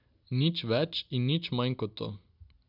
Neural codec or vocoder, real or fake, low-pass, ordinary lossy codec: none; real; 5.4 kHz; none